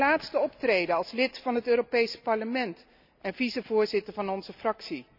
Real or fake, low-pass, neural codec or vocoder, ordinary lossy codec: real; 5.4 kHz; none; none